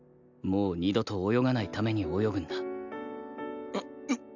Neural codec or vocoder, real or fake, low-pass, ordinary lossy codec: none; real; 7.2 kHz; none